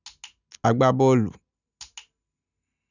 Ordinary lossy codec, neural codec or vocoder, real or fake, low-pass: none; none; real; 7.2 kHz